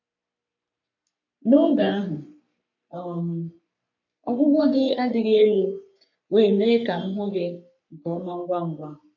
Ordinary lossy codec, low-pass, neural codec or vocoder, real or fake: none; 7.2 kHz; codec, 44.1 kHz, 3.4 kbps, Pupu-Codec; fake